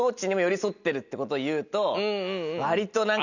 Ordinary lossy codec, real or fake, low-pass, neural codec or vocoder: none; real; 7.2 kHz; none